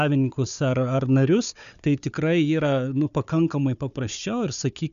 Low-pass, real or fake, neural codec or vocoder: 7.2 kHz; fake; codec, 16 kHz, 4 kbps, FunCodec, trained on Chinese and English, 50 frames a second